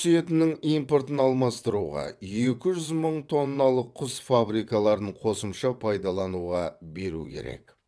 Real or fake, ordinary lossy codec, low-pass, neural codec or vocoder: fake; none; none; vocoder, 22.05 kHz, 80 mel bands, WaveNeXt